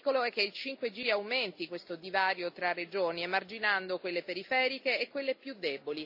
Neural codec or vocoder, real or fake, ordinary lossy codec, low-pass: none; real; AAC, 48 kbps; 5.4 kHz